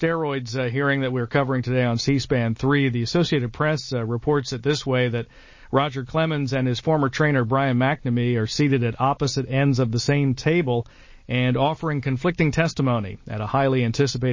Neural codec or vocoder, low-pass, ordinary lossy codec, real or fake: none; 7.2 kHz; MP3, 32 kbps; real